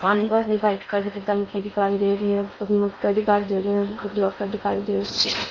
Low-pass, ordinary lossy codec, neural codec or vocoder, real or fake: 7.2 kHz; MP3, 48 kbps; codec, 16 kHz in and 24 kHz out, 0.6 kbps, FocalCodec, streaming, 4096 codes; fake